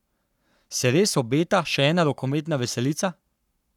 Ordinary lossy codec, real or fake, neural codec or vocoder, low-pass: none; fake; codec, 44.1 kHz, 7.8 kbps, Pupu-Codec; 19.8 kHz